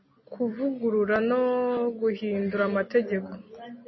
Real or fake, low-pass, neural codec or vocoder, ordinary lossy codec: real; 7.2 kHz; none; MP3, 24 kbps